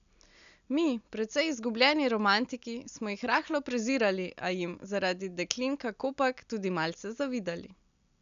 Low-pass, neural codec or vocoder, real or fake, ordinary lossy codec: 7.2 kHz; none; real; none